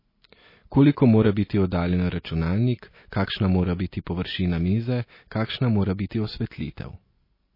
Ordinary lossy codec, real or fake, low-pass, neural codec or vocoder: MP3, 24 kbps; real; 5.4 kHz; none